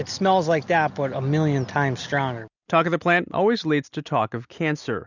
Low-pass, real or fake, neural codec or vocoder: 7.2 kHz; real; none